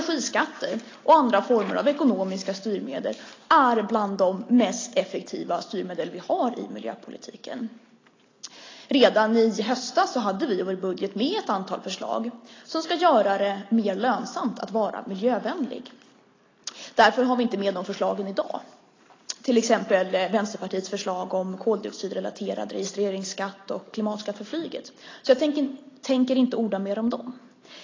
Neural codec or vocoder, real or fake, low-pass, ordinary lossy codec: none; real; 7.2 kHz; AAC, 32 kbps